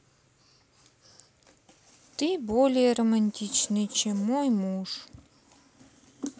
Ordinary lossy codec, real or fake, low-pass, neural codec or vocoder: none; real; none; none